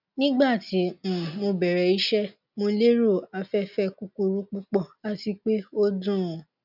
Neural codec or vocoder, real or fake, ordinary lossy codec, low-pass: none; real; none; 5.4 kHz